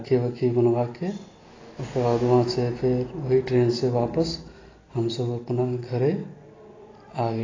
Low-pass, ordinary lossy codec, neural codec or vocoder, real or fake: 7.2 kHz; AAC, 32 kbps; none; real